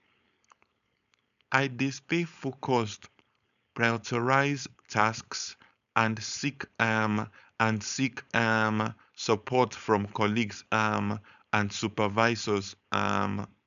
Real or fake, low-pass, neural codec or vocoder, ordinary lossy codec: fake; 7.2 kHz; codec, 16 kHz, 4.8 kbps, FACodec; none